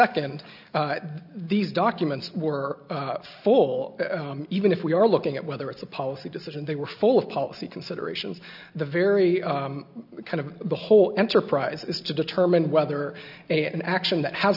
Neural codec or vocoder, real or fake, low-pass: none; real; 5.4 kHz